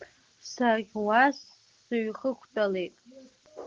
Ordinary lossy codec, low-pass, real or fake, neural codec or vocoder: Opus, 16 kbps; 7.2 kHz; real; none